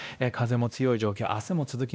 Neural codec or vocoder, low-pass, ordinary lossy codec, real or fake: codec, 16 kHz, 1 kbps, X-Codec, WavLM features, trained on Multilingual LibriSpeech; none; none; fake